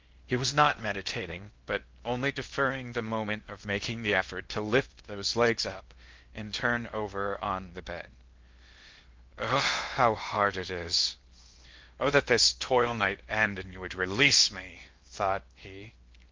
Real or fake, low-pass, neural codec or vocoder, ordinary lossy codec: fake; 7.2 kHz; codec, 16 kHz in and 24 kHz out, 0.6 kbps, FocalCodec, streaming, 4096 codes; Opus, 32 kbps